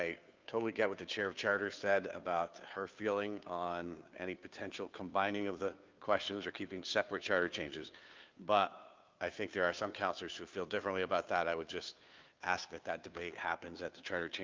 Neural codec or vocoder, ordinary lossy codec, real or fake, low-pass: codec, 16 kHz, 2 kbps, FunCodec, trained on Chinese and English, 25 frames a second; Opus, 24 kbps; fake; 7.2 kHz